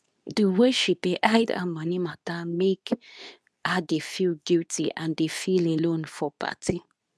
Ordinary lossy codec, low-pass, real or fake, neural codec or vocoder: none; none; fake; codec, 24 kHz, 0.9 kbps, WavTokenizer, medium speech release version 2